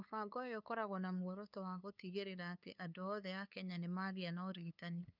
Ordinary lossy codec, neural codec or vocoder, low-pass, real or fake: none; codec, 16 kHz, 2 kbps, FunCodec, trained on LibriTTS, 25 frames a second; 5.4 kHz; fake